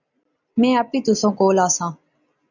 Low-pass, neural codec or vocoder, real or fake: 7.2 kHz; none; real